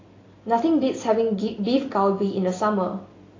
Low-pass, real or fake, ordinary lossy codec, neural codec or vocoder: 7.2 kHz; real; AAC, 32 kbps; none